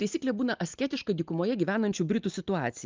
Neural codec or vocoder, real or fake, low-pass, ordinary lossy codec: none; real; 7.2 kHz; Opus, 24 kbps